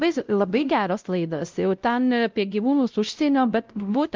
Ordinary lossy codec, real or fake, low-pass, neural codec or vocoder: Opus, 24 kbps; fake; 7.2 kHz; codec, 16 kHz, 0.5 kbps, X-Codec, WavLM features, trained on Multilingual LibriSpeech